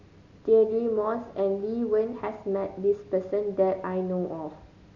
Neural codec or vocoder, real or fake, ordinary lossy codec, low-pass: none; real; none; 7.2 kHz